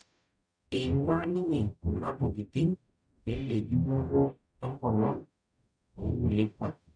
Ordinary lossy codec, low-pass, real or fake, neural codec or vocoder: none; 9.9 kHz; fake; codec, 44.1 kHz, 0.9 kbps, DAC